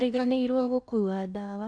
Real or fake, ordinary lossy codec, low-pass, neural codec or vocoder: fake; none; 9.9 kHz; codec, 16 kHz in and 24 kHz out, 0.6 kbps, FocalCodec, streaming, 2048 codes